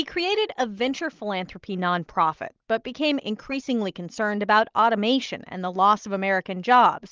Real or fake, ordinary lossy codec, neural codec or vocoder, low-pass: real; Opus, 24 kbps; none; 7.2 kHz